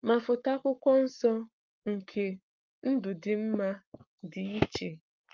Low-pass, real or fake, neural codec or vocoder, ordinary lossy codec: 7.2 kHz; fake; codec, 44.1 kHz, 7.8 kbps, Pupu-Codec; Opus, 24 kbps